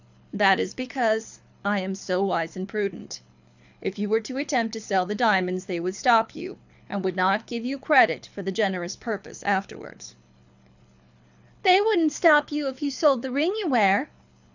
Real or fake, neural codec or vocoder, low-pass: fake; codec, 24 kHz, 6 kbps, HILCodec; 7.2 kHz